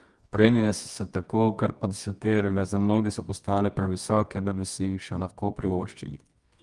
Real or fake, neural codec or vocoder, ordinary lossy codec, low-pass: fake; codec, 24 kHz, 0.9 kbps, WavTokenizer, medium music audio release; Opus, 32 kbps; 10.8 kHz